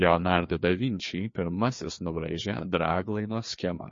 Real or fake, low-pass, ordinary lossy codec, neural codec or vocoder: fake; 7.2 kHz; MP3, 32 kbps; codec, 16 kHz, 2 kbps, FreqCodec, larger model